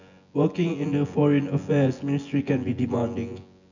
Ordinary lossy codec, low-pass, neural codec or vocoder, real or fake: none; 7.2 kHz; vocoder, 24 kHz, 100 mel bands, Vocos; fake